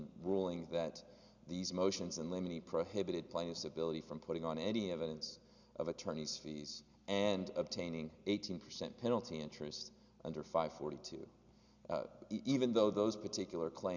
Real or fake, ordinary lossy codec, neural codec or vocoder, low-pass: real; Opus, 64 kbps; none; 7.2 kHz